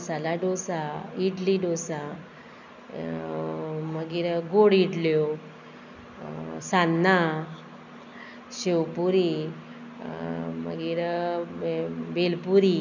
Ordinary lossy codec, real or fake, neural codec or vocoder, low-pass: none; real; none; 7.2 kHz